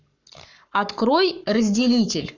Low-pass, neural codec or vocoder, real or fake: 7.2 kHz; codec, 44.1 kHz, 7.8 kbps, Pupu-Codec; fake